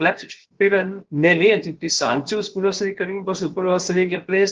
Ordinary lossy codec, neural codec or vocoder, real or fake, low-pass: Opus, 16 kbps; codec, 16 kHz, about 1 kbps, DyCAST, with the encoder's durations; fake; 7.2 kHz